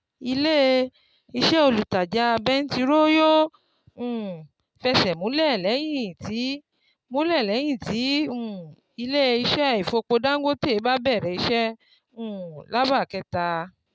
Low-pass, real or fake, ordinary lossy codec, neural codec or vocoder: none; real; none; none